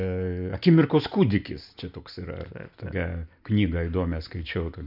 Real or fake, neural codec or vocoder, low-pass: real; none; 5.4 kHz